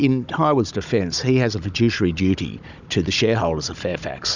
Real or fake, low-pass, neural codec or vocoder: fake; 7.2 kHz; codec, 16 kHz, 16 kbps, FunCodec, trained on Chinese and English, 50 frames a second